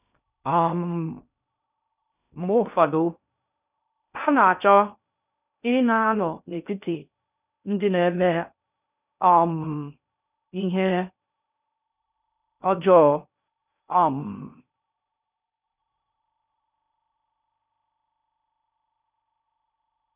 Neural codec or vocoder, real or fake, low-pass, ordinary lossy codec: codec, 16 kHz in and 24 kHz out, 0.6 kbps, FocalCodec, streaming, 2048 codes; fake; 3.6 kHz; none